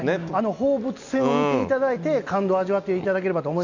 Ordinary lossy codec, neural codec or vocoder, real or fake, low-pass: none; none; real; 7.2 kHz